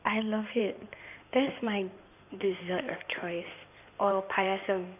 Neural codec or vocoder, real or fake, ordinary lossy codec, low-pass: codec, 16 kHz in and 24 kHz out, 2.2 kbps, FireRedTTS-2 codec; fake; none; 3.6 kHz